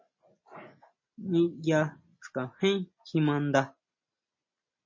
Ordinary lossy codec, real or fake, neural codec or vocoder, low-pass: MP3, 32 kbps; real; none; 7.2 kHz